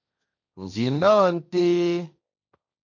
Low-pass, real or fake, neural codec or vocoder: 7.2 kHz; fake; codec, 16 kHz, 1.1 kbps, Voila-Tokenizer